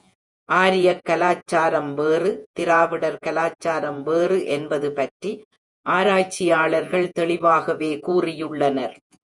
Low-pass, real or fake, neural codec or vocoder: 10.8 kHz; fake; vocoder, 48 kHz, 128 mel bands, Vocos